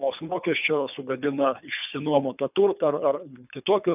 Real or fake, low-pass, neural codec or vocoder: fake; 3.6 kHz; codec, 24 kHz, 3 kbps, HILCodec